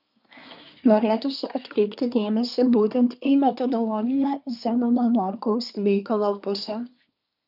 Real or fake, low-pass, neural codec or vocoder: fake; 5.4 kHz; codec, 24 kHz, 1 kbps, SNAC